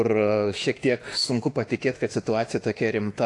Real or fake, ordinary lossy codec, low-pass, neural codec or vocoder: fake; AAC, 48 kbps; 10.8 kHz; autoencoder, 48 kHz, 32 numbers a frame, DAC-VAE, trained on Japanese speech